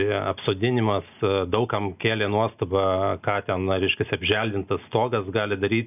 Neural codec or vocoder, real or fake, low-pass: none; real; 3.6 kHz